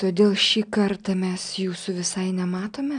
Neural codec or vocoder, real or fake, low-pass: none; real; 9.9 kHz